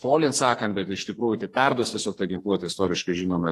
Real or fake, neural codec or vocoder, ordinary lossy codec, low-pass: fake; codec, 44.1 kHz, 2.6 kbps, SNAC; AAC, 48 kbps; 14.4 kHz